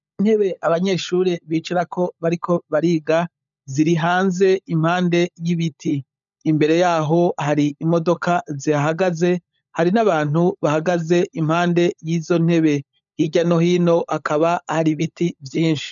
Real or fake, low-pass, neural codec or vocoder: fake; 7.2 kHz; codec, 16 kHz, 16 kbps, FunCodec, trained on LibriTTS, 50 frames a second